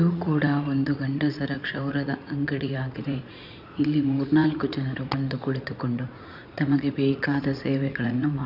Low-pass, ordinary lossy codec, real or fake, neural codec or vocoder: 5.4 kHz; none; fake; vocoder, 44.1 kHz, 80 mel bands, Vocos